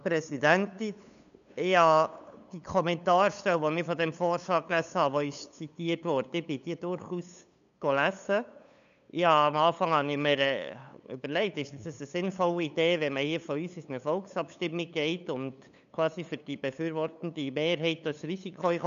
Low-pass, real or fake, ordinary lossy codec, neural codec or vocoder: 7.2 kHz; fake; none; codec, 16 kHz, 8 kbps, FunCodec, trained on LibriTTS, 25 frames a second